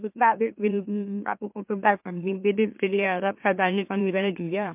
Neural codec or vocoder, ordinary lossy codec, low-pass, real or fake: autoencoder, 44.1 kHz, a latent of 192 numbers a frame, MeloTTS; MP3, 32 kbps; 3.6 kHz; fake